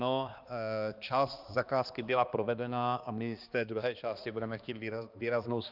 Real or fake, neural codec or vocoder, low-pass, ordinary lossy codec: fake; codec, 16 kHz, 2 kbps, X-Codec, HuBERT features, trained on balanced general audio; 5.4 kHz; Opus, 24 kbps